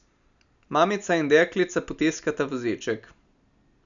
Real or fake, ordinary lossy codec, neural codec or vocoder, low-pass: real; none; none; 7.2 kHz